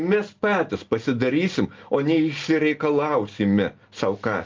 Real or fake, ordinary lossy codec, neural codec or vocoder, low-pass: real; Opus, 32 kbps; none; 7.2 kHz